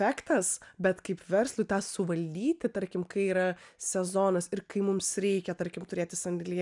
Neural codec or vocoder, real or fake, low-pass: none; real; 10.8 kHz